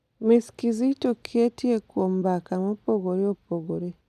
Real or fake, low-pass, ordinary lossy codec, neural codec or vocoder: real; 14.4 kHz; none; none